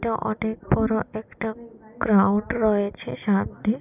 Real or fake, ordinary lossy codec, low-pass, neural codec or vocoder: real; none; 3.6 kHz; none